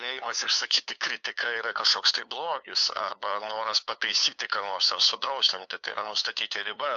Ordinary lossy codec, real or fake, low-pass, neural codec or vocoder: AAC, 64 kbps; fake; 7.2 kHz; codec, 16 kHz, 2 kbps, FunCodec, trained on LibriTTS, 25 frames a second